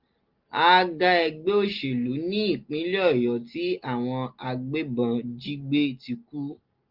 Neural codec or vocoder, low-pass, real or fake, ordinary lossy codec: none; 5.4 kHz; real; Opus, 32 kbps